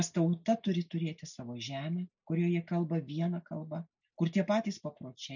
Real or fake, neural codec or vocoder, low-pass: real; none; 7.2 kHz